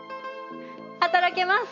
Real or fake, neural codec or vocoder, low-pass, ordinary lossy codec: real; none; 7.2 kHz; none